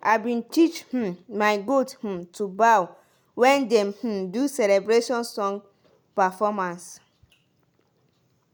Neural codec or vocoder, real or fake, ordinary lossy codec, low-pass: none; real; none; none